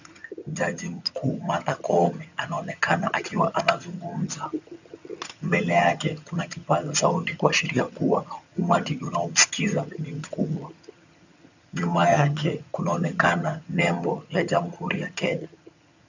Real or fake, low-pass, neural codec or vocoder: fake; 7.2 kHz; vocoder, 22.05 kHz, 80 mel bands, HiFi-GAN